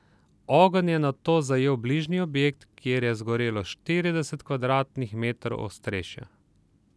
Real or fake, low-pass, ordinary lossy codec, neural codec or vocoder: real; none; none; none